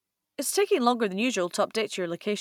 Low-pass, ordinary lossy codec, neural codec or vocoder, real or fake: 19.8 kHz; none; vocoder, 44.1 kHz, 128 mel bands every 256 samples, BigVGAN v2; fake